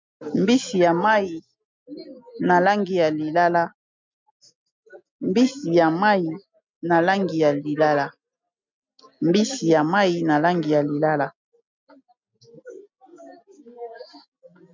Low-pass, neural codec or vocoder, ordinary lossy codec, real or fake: 7.2 kHz; none; MP3, 64 kbps; real